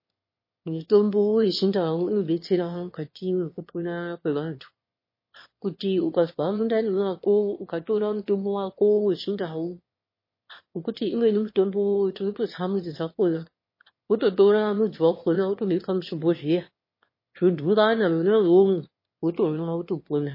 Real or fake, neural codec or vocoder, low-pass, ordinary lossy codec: fake; autoencoder, 22.05 kHz, a latent of 192 numbers a frame, VITS, trained on one speaker; 5.4 kHz; MP3, 24 kbps